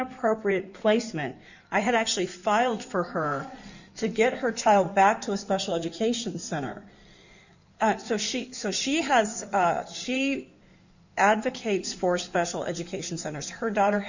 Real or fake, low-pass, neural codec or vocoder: fake; 7.2 kHz; codec, 16 kHz in and 24 kHz out, 2.2 kbps, FireRedTTS-2 codec